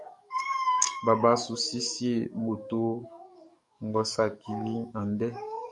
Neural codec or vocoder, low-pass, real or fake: codec, 44.1 kHz, 7.8 kbps, DAC; 10.8 kHz; fake